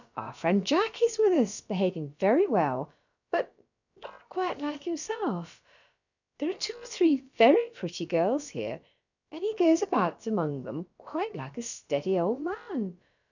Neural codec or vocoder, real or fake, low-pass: codec, 16 kHz, about 1 kbps, DyCAST, with the encoder's durations; fake; 7.2 kHz